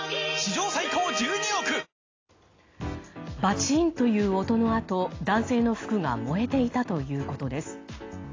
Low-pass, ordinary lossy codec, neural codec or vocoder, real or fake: 7.2 kHz; AAC, 32 kbps; none; real